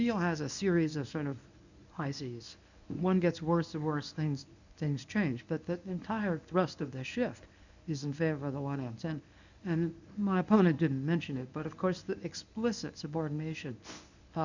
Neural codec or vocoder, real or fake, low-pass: codec, 24 kHz, 0.9 kbps, WavTokenizer, medium speech release version 1; fake; 7.2 kHz